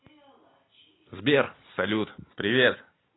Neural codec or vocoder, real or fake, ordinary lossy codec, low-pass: none; real; AAC, 16 kbps; 7.2 kHz